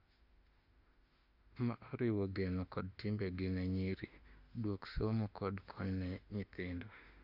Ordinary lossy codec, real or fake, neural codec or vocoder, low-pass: Opus, 64 kbps; fake; autoencoder, 48 kHz, 32 numbers a frame, DAC-VAE, trained on Japanese speech; 5.4 kHz